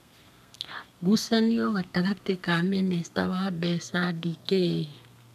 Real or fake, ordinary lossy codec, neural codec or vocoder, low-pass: fake; none; codec, 32 kHz, 1.9 kbps, SNAC; 14.4 kHz